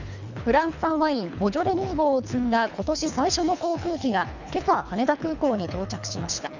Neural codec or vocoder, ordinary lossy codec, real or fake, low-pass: codec, 24 kHz, 3 kbps, HILCodec; none; fake; 7.2 kHz